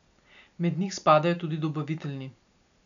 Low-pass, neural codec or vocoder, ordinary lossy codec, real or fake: 7.2 kHz; none; none; real